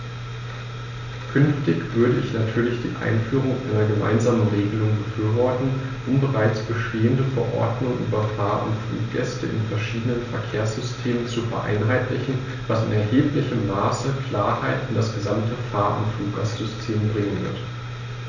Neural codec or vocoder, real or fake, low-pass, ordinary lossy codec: none; real; 7.2 kHz; none